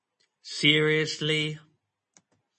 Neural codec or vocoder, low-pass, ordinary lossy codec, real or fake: none; 10.8 kHz; MP3, 32 kbps; real